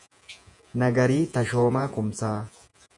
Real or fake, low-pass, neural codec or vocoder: fake; 10.8 kHz; vocoder, 48 kHz, 128 mel bands, Vocos